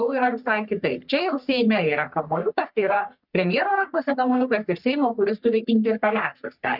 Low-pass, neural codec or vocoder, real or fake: 5.4 kHz; codec, 44.1 kHz, 3.4 kbps, Pupu-Codec; fake